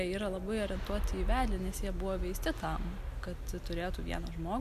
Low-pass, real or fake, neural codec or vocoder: 14.4 kHz; real; none